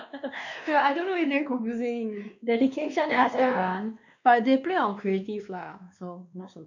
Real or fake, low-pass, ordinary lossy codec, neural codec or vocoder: fake; 7.2 kHz; none; codec, 16 kHz, 2 kbps, X-Codec, WavLM features, trained on Multilingual LibriSpeech